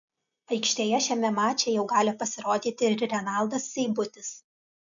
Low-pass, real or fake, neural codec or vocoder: 7.2 kHz; real; none